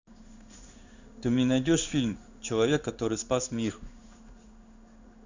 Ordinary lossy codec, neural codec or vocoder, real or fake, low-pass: Opus, 64 kbps; codec, 16 kHz in and 24 kHz out, 1 kbps, XY-Tokenizer; fake; 7.2 kHz